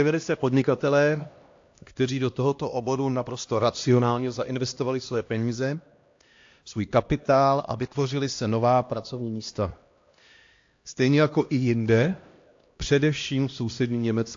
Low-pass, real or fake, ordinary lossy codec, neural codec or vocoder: 7.2 kHz; fake; AAC, 48 kbps; codec, 16 kHz, 1 kbps, X-Codec, HuBERT features, trained on LibriSpeech